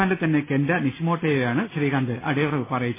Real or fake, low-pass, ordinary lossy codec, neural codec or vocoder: real; 3.6 kHz; MP3, 16 kbps; none